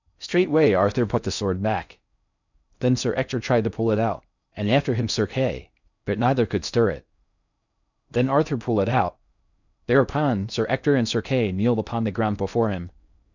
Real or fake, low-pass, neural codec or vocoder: fake; 7.2 kHz; codec, 16 kHz in and 24 kHz out, 0.6 kbps, FocalCodec, streaming, 4096 codes